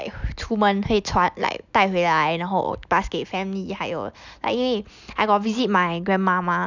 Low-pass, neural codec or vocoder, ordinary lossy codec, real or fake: 7.2 kHz; none; none; real